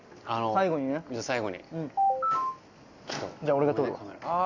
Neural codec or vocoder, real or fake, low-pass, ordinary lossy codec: none; real; 7.2 kHz; Opus, 64 kbps